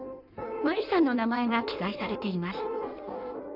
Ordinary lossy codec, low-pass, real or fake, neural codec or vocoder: none; 5.4 kHz; fake; codec, 16 kHz in and 24 kHz out, 1.1 kbps, FireRedTTS-2 codec